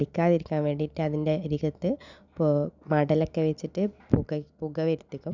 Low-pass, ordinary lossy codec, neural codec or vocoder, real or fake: 7.2 kHz; none; none; real